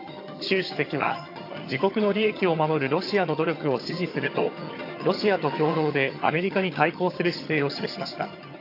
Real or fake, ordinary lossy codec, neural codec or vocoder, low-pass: fake; AAC, 32 kbps; vocoder, 22.05 kHz, 80 mel bands, HiFi-GAN; 5.4 kHz